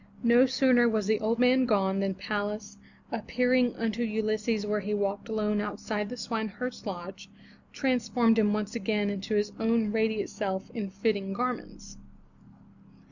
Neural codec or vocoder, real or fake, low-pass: none; real; 7.2 kHz